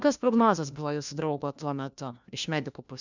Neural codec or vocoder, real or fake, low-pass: codec, 16 kHz, 1 kbps, FunCodec, trained on LibriTTS, 50 frames a second; fake; 7.2 kHz